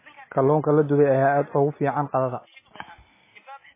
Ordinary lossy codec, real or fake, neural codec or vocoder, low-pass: MP3, 16 kbps; real; none; 3.6 kHz